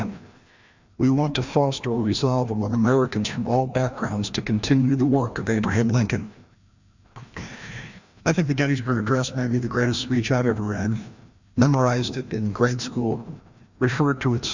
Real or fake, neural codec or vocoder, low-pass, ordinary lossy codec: fake; codec, 16 kHz, 1 kbps, FreqCodec, larger model; 7.2 kHz; Opus, 64 kbps